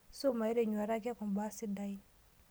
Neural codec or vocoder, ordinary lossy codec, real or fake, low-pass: none; none; real; none